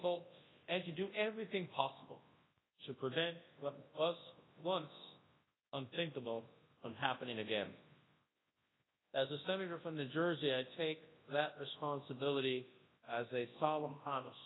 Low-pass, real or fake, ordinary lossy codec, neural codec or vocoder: 7.2 kHz; fake; AAC, 16 kbps; codec, 24 kHz, 0.9 kbps, WavTokenizer, large speech release